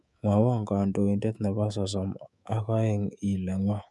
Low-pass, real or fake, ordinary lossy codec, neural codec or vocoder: none; fake; none; codec, 24 kHz, 3.1 kbps, DualCodec